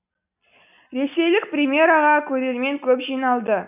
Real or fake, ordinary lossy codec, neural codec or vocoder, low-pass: real; none; none; 3.6 kHz